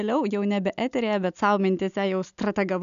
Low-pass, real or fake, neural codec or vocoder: 7.2 kHz; real; none